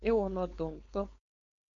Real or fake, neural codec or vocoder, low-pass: fake; codec, 16 kHz, 4.8 kbps, FACodec; 7.2 kHz